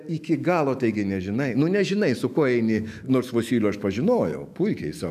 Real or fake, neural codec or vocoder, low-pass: fake; autoencoder, 48 kHz, 128 numbers a frame, DAC-VAE, trained on Japanese speech; 14.4 kHz